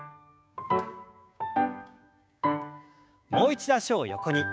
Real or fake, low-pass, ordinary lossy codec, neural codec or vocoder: fake; none; none; codec, 16 kHz, 6 kbps, DAC